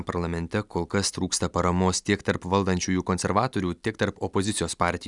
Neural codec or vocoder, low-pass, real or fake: none; 10.8 kHz; real